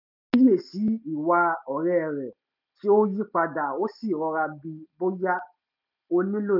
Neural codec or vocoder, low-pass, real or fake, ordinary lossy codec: none; 5.4 kHz; real; none